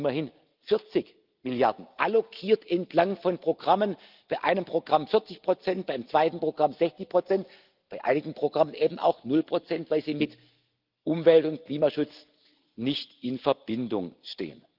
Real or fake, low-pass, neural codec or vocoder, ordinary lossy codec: real; 5.4 kHz; none; Opus, 24 kbps